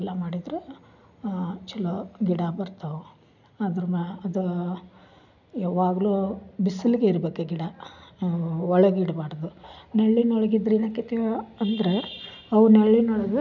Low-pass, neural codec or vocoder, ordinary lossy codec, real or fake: 7.2 kHz; none; none; real